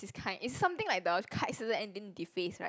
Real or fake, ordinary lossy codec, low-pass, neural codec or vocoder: real; none; none; none